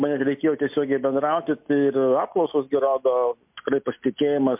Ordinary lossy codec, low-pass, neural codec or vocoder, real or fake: MP3, 32 kbps; 3.6 kHz; none; real